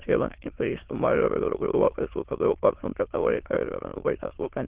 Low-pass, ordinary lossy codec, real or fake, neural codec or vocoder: 3.6 kHz; Opus, 16 kbps; fake; autoencoder, 22.05 kHz, a latent of 192 numbers a frame, VITS, trained on many speakers